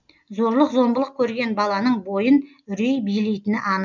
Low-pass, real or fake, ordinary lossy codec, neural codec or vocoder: 7.2 kHz; real; none; none